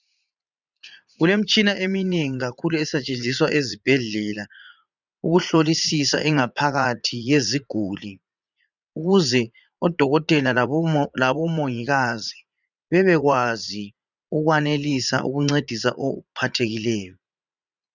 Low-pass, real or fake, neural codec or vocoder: 7.2 kHz; fake; vocoder, 44.1 kHz, 80 mel bands, Vocos